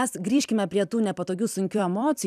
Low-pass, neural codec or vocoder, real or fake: 14.4 kHz; vocoder, 44.1 kHz, 128 mel bands every 256 samples, BigVGAN v2; fake